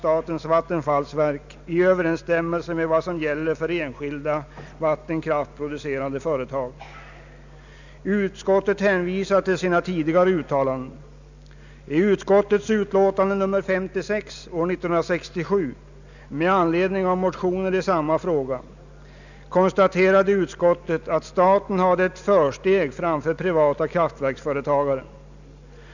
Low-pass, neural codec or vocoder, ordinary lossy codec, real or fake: 7.2 kHz; none; none; real